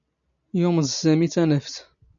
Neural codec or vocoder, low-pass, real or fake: none; 7.2 kHz; real